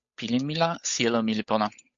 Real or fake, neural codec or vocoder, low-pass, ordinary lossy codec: fake; codec, 16 kHz, 8 kbps, FunCodec, trained on Chinese and English, 25 frames a second; 7.2 kHz; MP3, 48 kbps